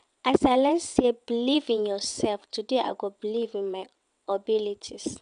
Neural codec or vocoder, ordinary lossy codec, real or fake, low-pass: vocoder, 22.05 kHz, 80 mel bands, WaveNeXt; none; fake; 9.9 kHz